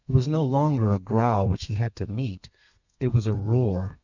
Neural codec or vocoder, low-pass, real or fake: codec, 32 kHz, 1.9 kbps, SNAC; 7.2 kHz; fake